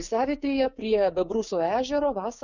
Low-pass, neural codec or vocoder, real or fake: 7.2 kHz; codec, 16 kHz, 6 kbps, DAC; fake